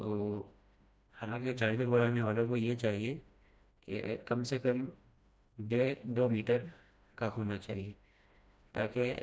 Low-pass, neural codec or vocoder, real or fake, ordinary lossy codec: none; codec, 16 kHz, 1 kbps, FreqCodec, smaller model; fake; none